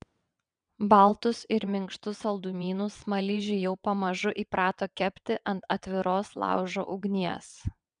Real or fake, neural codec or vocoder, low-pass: fake; vocoder, 22.05 kHz, 80 mel bands, WaveNeXt; 9.9 kHz